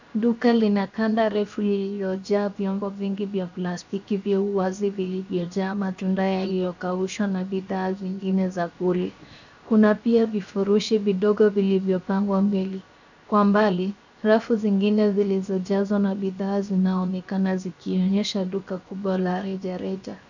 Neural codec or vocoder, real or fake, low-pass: codec, 16 kHz, 0.7 kbps, FocalCodec; fake; 7.2 kHz